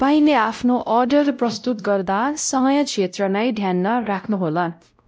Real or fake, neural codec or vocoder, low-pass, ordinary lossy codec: fake; codec, 16 kHz, 0.5 kbps, X-Codec, WavLM features, trained on Multilingual LibriSpeech; none; none